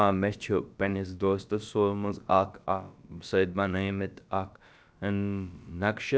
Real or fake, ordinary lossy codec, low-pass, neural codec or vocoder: fake; none; none; codec, 16 kHz, about 1 kbps, DyCAST, with the encoder's durations